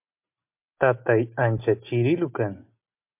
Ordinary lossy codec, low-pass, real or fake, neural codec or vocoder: MP3, 32 kbps; 3.6 kHz; real; none